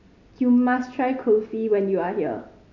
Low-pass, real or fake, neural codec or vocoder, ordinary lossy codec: 7.2 kHz; real; none; none